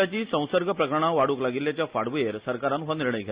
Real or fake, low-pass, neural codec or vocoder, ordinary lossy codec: real; 3.6 kHz; none; Opus, 64 kbps